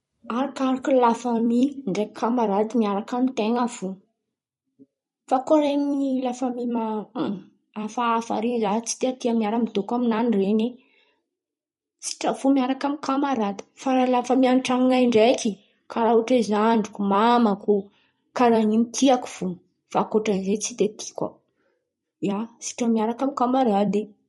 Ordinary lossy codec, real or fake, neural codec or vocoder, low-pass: MP3, 48 kbps; fake; vocoder, 44.1 kHz, 128 mel bands every 256 samples, BigVGAN v2; 19.8 kHz